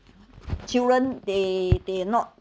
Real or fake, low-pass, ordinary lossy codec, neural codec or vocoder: real; none; none; none